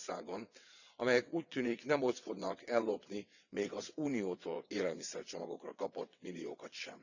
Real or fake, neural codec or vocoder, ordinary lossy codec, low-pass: fake; vocoder, 22.05 kHz, 80 mel bands, WaveNeXt; none; 7.2 kHz